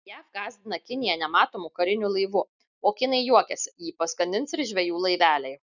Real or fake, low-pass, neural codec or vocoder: real; 7.2 kHz; none